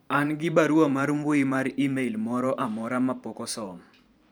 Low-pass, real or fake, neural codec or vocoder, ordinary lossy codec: none; fake; vocoder, 44.1 kHz, 128 mel bands every 512 samples, BigVGAN v2; none